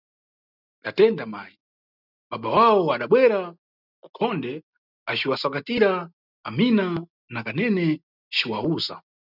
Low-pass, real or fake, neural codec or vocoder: 5.4 kHz; real; none